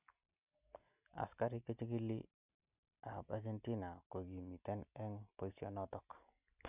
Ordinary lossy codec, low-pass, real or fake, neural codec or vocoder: none; 3.6 kHz; real; none